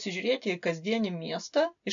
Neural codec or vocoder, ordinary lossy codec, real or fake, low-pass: none; AAC, 64 kbps; real; 7.2 kHz